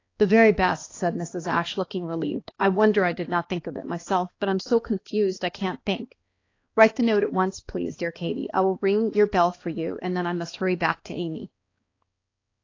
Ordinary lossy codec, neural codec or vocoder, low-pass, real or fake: AAC, 32 kbps; codec, 16 kHz, 2 kbps, X-Codec, HuBERT features, trained on balanced general audio; 7.2 kHz; fake